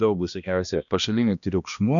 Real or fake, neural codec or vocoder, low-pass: fake; codec, 16 kHz, 1 kbps, X-Codec, HuBERT features, trained on balanced general audio; 7.2 kHz